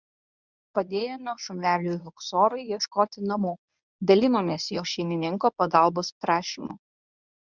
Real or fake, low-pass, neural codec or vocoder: fake; 7.2 kHz; codec, 24 kHz, 0.9 kbps, WavTokenizer, medium speech release version 1